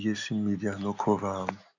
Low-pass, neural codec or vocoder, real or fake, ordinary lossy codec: 7.2 kHz; none; real; none